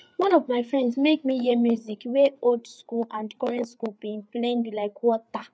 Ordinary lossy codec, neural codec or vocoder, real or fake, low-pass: none; codec, 16 kHz, 4 kbps, FreqCodec, larger model; fake; none